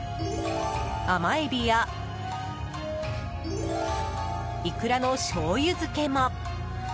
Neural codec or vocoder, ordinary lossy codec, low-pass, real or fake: none; none; none; real